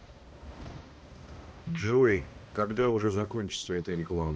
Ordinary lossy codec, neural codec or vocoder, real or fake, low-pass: none; codec, 16 kHz, 1 kbps, X-Codec, HuBERT features, trained on balanced general audio; fake; none